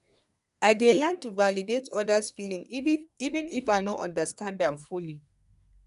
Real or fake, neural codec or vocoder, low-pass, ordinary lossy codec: fake; codec, 24 kHz, 1 kbps, SNAC; 10.8 kHz; none